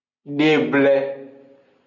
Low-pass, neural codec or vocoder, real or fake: 7.2 kHz; none; real